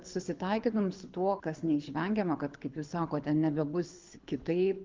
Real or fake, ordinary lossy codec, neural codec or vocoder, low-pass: fake; Opus, 32 kbps; codec, 24 kHz, 6 kbps, HILCodec; 7.2 kHz